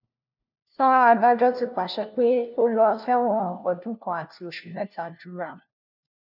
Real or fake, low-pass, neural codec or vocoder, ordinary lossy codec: fake; 5.4 kHz; codec, 16 kHz, 1 kbps, FunCodec, trained on LibriTTS, 50 frames a second; none